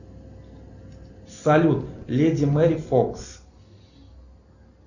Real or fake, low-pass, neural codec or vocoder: real; 7.2 kHz; none